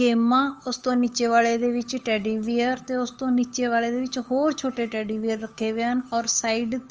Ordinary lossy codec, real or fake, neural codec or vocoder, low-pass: Opus, 24 kbps; fake; codec, 16 kHz, 8 kbps, FunCodec, trained on Chinese and English, 25 frames a second; 7.2 kHz